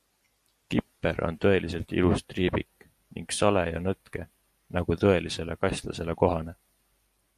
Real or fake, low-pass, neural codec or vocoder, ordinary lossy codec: real; 14.4 kHz; none; AAC, 96 kbps